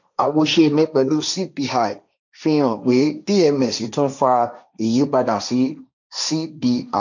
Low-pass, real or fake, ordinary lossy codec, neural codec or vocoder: 7.2 kHz; fake; none; codec, 16 kHz, 1.1 kbps, Voila-Tokenizer